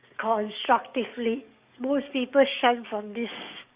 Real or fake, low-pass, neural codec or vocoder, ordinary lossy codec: fake; 3.6 kHz; codec, 44.1 kHz, 7.8 kbps, DAC; Opus, 64 kbps